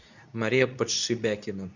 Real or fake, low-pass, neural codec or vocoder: fake; 7.2 kHz; codec, 24 kHz, 0.9 kbps, WavTokenizer, medium speech release version 2